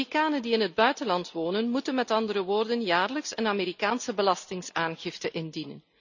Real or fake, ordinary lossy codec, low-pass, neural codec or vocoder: real; none; 7.2 kHz; none